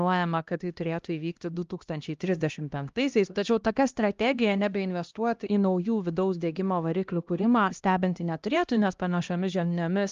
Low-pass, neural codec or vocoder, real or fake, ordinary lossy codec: 7.2 kHz; codec, 16 kHz, 1 kbps, X-Codec, HuBERT features, trained on LibriSpeech; fake; Opus, 32 kbps